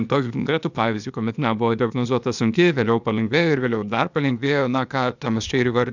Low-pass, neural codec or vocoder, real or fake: 7.2 kHz; codec, 16 kHz, 0.8 kbps, ZipCodec; fake